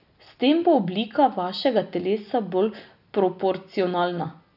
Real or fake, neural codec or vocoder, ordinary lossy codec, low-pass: real; none; none; 5.4 kHz